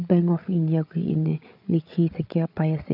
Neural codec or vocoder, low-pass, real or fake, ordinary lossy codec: codec, 16 kHz, 8 kbps, FreqCodec, larger model; 5.4 kHz; fake; none